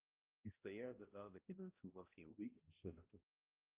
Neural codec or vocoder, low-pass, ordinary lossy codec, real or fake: codec, 16 kHz, 0.5 kbps, X-Codec, HuBERT features, trained on balanced general audio; 3.6 kHz; Opus, 24 kbps; fake